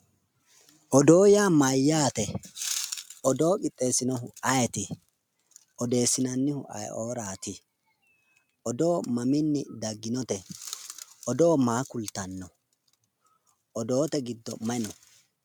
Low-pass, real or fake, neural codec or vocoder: 19.8 kHz; real; none